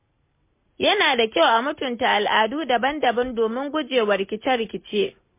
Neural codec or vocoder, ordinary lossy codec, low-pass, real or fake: none; MP3, 24 kbps; 3.6 kHz; real